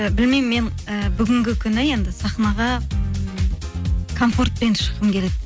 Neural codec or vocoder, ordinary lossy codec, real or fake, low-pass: none; none; real; none